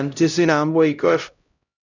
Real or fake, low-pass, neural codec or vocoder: fake; 7.2 kHz; codec, 16 kHz, 0.5 kbps, X-Codec, HuBERT features, trained on LibriSpeech